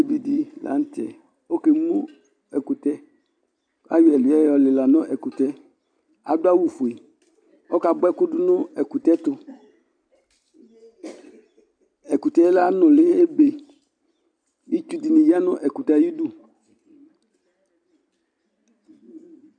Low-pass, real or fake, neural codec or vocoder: 9.9 kHz; real; none